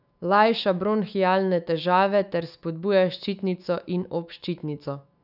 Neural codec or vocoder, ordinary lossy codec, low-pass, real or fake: autoencoder, 48 kHz, 128 numbers a frame, DAC-VAE, trained on Japanese speech; none; 5.4 kHz; fake